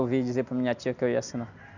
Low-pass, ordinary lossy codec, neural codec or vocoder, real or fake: 7.2 kHz; none; none; real